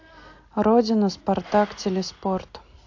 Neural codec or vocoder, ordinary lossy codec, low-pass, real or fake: none; AAC, 48 kbps; 7.2 kHz; real